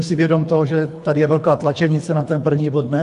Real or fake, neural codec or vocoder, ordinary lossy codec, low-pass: fake; codec, 24 kHz, 3 kbps, HILCodec; AAC, 64 kbps; 10.8 kHz